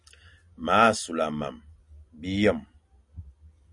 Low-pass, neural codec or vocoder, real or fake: 10.8 kHz; none; real